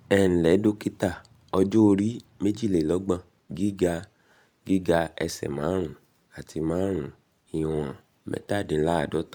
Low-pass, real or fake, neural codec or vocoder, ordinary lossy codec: 19.8 kHz; fake; vocoder, 44.1 kHz, 128 mel bands every 512 samples, BigVGAN v2; none